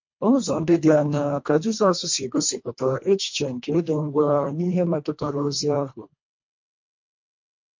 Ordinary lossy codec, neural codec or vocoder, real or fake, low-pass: MP3, 48 kbps; codec, 24 kHz, 1.5 kbps, HILCodec; fake; 7.2 kHz